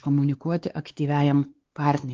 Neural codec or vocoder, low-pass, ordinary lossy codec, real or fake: codec, 16 kHz, 2 kbps, X-Codec, WavLM features, trained on Multilingual LibriSpeech; 7.2 kHz; Opus, 16 kbps; fake